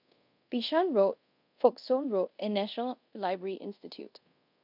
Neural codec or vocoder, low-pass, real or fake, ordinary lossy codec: codec, 24 kHz, 0.5 kbps, DualCodec; 5.4 kHz; fake; none